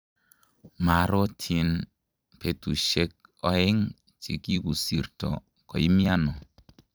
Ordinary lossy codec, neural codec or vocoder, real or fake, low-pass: none; vocoder, 44.1 kHz, 128 mel bands every 256 samples, BigVGAN v2; fake; none